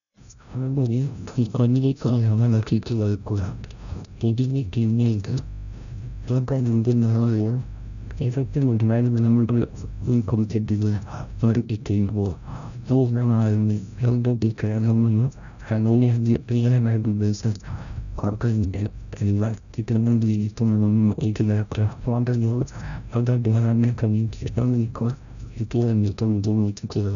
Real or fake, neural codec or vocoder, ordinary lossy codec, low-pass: fake; codec, 16 kHz, 0.5 kbps, FreqCodec, larger model; none; 7.2 kHz